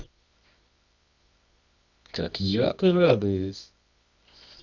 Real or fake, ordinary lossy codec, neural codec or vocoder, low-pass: fake; none; codec, 24 kHz, 0.9 kbps, WavTokenizer, medium music audio release; 7.2 kHz